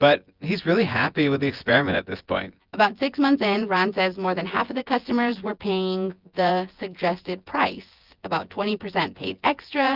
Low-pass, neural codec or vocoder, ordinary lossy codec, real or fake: 5.4 kHz; vocoder, 24 kHz, 100 mel bands, Vocos; Opus, 32 kbps; fake